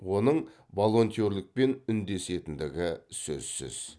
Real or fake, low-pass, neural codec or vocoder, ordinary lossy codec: real; none; none; none